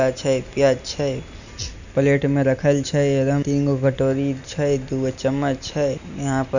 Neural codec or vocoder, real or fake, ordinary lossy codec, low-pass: none; real; none; 7.2 kHz